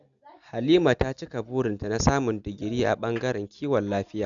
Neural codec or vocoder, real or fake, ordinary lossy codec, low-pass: none; real; none; 7.2 kHz